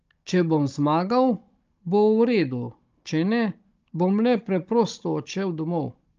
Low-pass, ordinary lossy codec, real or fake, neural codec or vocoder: 7.2 kHz; Opus, 24 kbps; fake; codec, 16 kHz, 16 kbps, FunCodec, trained on Chinese and English, 50 frames a second